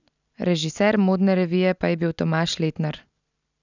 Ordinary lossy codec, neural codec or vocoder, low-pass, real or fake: none; none; 7.2 kHz; real